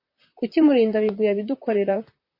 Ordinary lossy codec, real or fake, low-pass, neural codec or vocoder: MP3, 32 kbps; fake; 5.4 kHz; vocoder, 44.1 kHz, 128 mel bands, Pupu-Vocoder